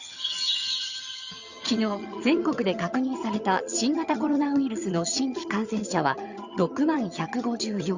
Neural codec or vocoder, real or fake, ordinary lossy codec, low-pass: vocoder, 22.05 kHz, 80 mel bands, HiFi-GAN; fake; Opus, 64 kbps; 7.2 kHz